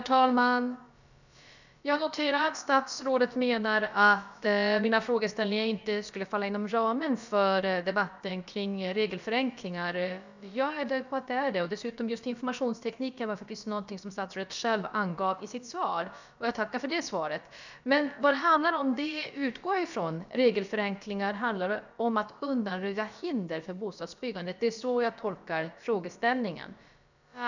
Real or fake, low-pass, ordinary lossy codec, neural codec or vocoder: fake; 7.2 kHz; none; codec, 16 kHz, about 1 kbps, DyCAST, with the encoder's durations